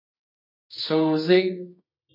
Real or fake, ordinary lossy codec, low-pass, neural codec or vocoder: fake; MP3, 32 kbps; 5.4 kHz; codec, 24 kHz, 0.9 kbps, WavTokenizer, medium music audio release